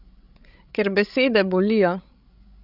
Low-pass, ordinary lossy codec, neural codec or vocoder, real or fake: 5.4 kHz; none; codec, 16 kHz, 16 kbps, FreqCodec, larger model; fake